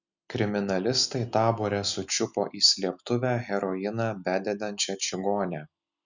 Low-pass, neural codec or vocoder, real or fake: 7.2 kHz; none; real